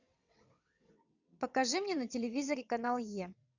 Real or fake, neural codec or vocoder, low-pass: fake; vocoder, 22.05 kHz, 80 mel bands, WaveNeXt; 7.2 kHz